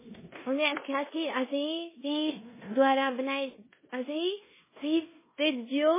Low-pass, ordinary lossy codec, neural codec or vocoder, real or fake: 3.6 kHz; MP3, 16 kbps; codec, 16 kHz in and 24 kHz out, 0.9 kbps, LongCat-Audio-Codec, four codebook decoder; fake